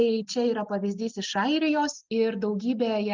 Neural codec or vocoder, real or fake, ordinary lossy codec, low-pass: none; real; Opus, 32 kbps; 7.2 kHz